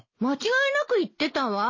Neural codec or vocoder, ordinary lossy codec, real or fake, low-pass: none; MP3, 32 kbps; real; 7.2 kHz